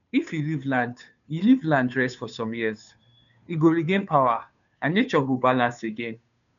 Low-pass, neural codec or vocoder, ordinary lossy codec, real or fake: 7.2 kHz; codec, 16 kHz, 2 kbps, FunCodec, trained on Chinese and English, 25 frames a second; none; fake